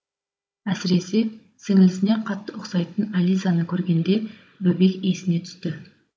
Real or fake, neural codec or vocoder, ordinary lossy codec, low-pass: fake; codec, 16 kHz, 16 kbps, FunCodec, trained on Chinese and English, 50 frames a second; none; none